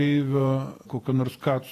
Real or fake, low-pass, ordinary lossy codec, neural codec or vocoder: fake; 14.4 kHz; AAC, 48 kbps; vocoder, 48 kHz, 128 mel bands, Vocos